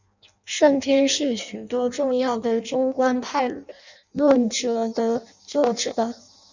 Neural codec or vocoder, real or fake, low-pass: codec, 16 kHz in and 24 kHz out, 0.6 kbps, FireRedTTS-2 codec; fake; 7.2 kHz